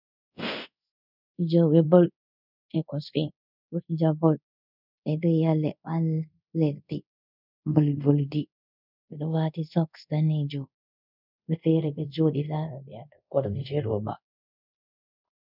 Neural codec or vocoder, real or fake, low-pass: codec, 24 kHz, 0.5 kbps, DualCodec; fake; 5.4 kHz